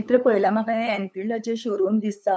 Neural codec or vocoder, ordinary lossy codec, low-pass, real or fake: codec, 16 kHz, 8 kbps, FunCodec, trained on LibriTTS, 25 frames a second; none; none; fake